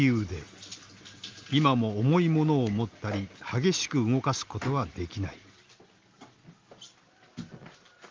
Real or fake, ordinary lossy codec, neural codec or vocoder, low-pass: real; Opus, 32 kbps; none; 7.2 kHz